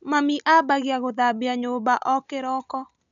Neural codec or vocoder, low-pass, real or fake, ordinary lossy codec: none; 7.2 kHz; real; none